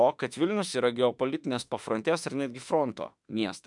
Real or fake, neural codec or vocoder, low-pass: fake; autoencoder, 48 kHz, 32 numbers a frame, DAC-VAE, trained on Japanese speech; 10.8 kHz